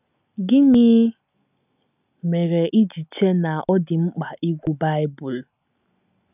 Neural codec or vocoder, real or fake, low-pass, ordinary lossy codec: none; real; 3.6 kHz; none